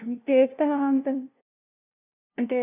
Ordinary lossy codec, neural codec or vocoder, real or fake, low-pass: none; codec, 16 kHz, 0.5 kbps, FunCodec, trained on LibriTTS, 25 frames a second; fake; 3.6 kHz